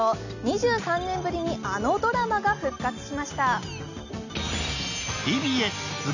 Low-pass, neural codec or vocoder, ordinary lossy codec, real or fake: 7.2 kHz; none; none; real